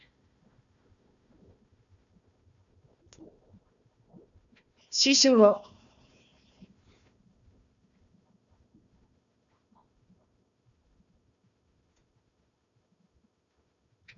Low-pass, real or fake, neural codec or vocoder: 7.2 kHz; fake; codec, 16 kHz, 1 kbps, FunCodec, trained on Chinese and English, 50 frames a second